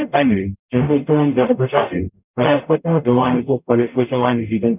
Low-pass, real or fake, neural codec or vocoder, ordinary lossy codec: 3.6 kHz; fake; codec, 44.1 kHz, 0.9 kbps, DAC; none